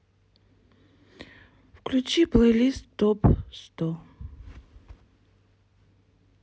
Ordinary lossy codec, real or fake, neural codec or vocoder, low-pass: none; real; none; none